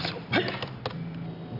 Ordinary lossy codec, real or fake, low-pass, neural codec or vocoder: none; real; 5.4 kHz; none